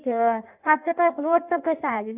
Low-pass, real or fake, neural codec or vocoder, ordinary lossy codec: 3.6 kHz; fake; codec, 16 kHz in and 24 kHz out, 1.1 kbps, FireRedTTS-2 codec; none